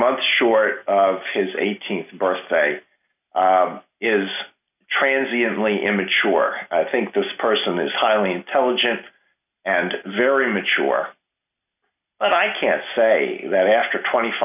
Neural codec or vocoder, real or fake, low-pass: none; real; 3.6 kHz